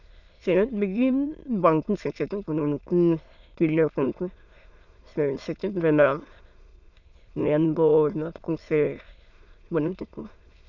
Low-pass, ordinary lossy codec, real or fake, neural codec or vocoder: 7.2 kHz; none; fake; autoencoder, 22.05 kHz, a latent of 192 numbers a frame, VITS, trained on many speakers